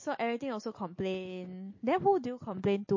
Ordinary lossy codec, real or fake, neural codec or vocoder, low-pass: MP3, 32 kbps; real; none; 7.2 kHz